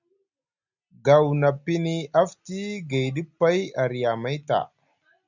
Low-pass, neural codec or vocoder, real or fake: 7.2 kHz; none; real